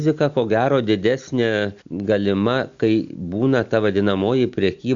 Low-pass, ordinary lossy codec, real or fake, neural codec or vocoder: 7.2 kHz; Opus, 64 kbps; real; none